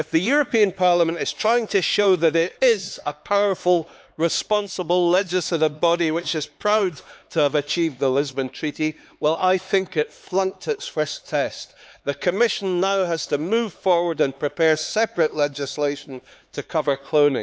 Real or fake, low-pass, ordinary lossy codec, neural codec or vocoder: fake; none; none; codec, 16 kHz, 4 kbps, X-Codec, HuBERT features, trained on LibriSpeech